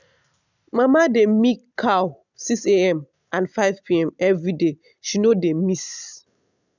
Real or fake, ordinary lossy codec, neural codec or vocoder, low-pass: real; none; none; 7.2 kHz